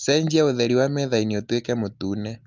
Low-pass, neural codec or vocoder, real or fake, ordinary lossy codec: 7.2 kHz; none; real; Opus, 24 kbps